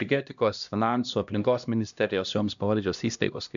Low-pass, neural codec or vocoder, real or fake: 7.2 kHz; codec, 16 kHz, 1 kbps, X-Codec, HuBERT features, trained on LibriSpeech; fake